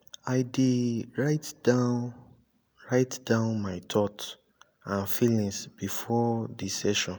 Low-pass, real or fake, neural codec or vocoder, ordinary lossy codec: none; real; none; none